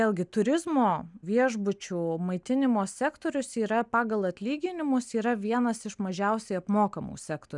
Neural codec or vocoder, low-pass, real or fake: none; 10.8 kHz; real